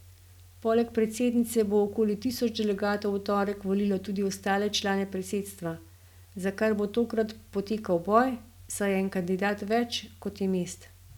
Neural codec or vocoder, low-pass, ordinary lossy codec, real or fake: none; 19.8 kHz; none; real